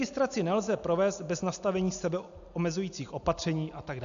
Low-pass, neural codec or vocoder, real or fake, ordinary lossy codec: 7.2 kHz; none; real; AAC, 96 kbps